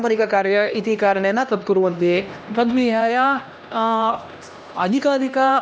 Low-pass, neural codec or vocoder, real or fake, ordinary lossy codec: none; codec, 16 kHz, 1 kbps, X-Codec, HuBERT features, trained on LibriSpeech; fake; none